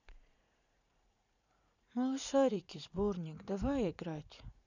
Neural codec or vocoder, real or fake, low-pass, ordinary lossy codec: vocoder, 44.1 kHz, 80 mel bands, Vocos; fake; 7.2 kHz; none